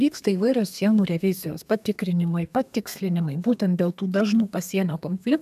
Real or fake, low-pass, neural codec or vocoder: fake; 14.4 kHz; codec, 32 kHz, 1.9 kbps, SNAC